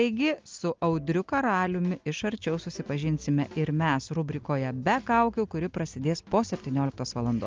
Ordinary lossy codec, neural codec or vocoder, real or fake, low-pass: Opus, 24 kbps; none; real; 7.2 kHz